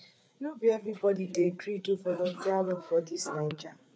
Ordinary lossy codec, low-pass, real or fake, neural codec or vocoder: none; none; fake; codec, 16 kHz, 4 kbps, FreqCodec, larger model